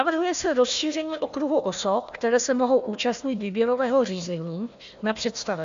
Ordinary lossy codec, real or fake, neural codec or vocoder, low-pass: MP3, 64 kbps; fake; codec, 16 kHz, 1 kbps, FunCodec, trained on Chinese and English, 50 frames a second; 7.2 kHz